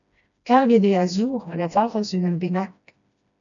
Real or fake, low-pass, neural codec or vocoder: fake; 7.2 kHz; codec, 16 kHz, 1 kbps, FreqCodec, smaller model